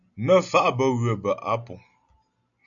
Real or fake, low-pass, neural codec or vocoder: real; 7.2 kHz; none